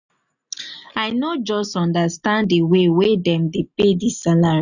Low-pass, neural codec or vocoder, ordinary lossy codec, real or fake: 7.2 kHz; none; none; real